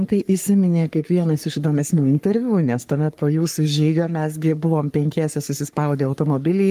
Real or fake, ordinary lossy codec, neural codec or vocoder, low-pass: fake; Opus, 32 kbps; codec, 44.1 kHz, 3.4 kbps, Pupu-Codec; 14.4 kHz